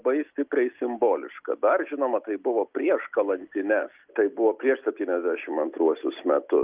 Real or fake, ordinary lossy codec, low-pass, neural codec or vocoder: real; Opus, 32 kbps; 3.6 kHz; none